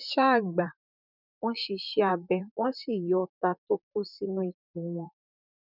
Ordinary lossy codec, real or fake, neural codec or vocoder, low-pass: none; fake; vocoder, 44.1 kHz, 128 mel bands, Pupu-Vocoder; 5.4 kHz